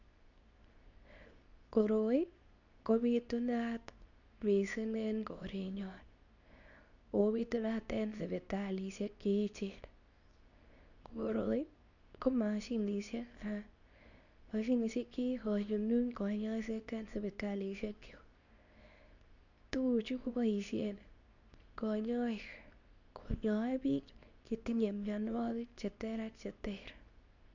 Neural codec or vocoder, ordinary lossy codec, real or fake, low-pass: codec, 24 kHz, 0.9 kbps, WavTokenizer, medium speech release version 2; none; fake; 7.2 kHz